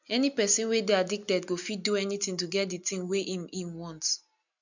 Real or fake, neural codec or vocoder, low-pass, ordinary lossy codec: real; none; 7.2 kHz; none